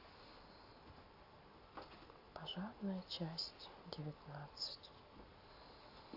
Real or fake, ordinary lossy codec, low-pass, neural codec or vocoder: real; AAC, 32 kbps; 5.4 kHz; none